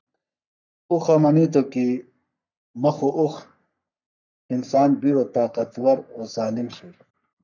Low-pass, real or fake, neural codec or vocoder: 7.2 kHz; fake; codec, 44.1 kHz, 3.4 kbps, Pupu-Codec